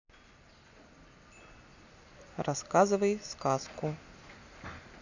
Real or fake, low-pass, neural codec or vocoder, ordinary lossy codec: real; 7.2 kHz; none; none